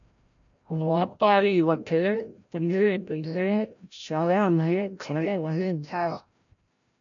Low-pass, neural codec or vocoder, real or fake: 7.2 kHz; codec, 16 kHz, 0.5 kbps, FreqCodec, larger model; fake